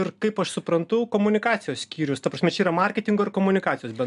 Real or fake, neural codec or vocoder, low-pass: real; none; 10.8 kHz